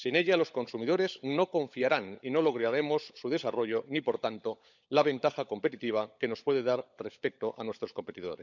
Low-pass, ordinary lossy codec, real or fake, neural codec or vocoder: 7.2 kHz; none; fake; codec, 16 kHz, 16 kbps, FunCodec, trained on LibriTTS, 50 frames a second